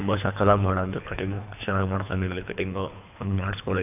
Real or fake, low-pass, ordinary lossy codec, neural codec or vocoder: fake; 3.6 kHz; none; codec, 24 kHz, 3 kbps, HILCodec